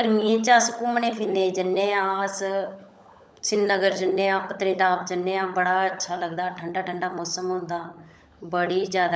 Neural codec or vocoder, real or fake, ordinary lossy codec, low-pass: codec, 16 kHz, 16 kbps, FunCodec, trained on LibriTTS, 50 frames a second; fake; none; none